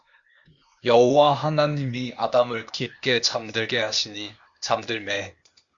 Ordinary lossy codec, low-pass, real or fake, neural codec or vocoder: Opus, 64 kbps; 7.2 kHz; fake; codec, 16 kHz, 0.8 kbps, ZipCodec